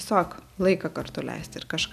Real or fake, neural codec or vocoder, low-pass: real; none; 14.4 kHz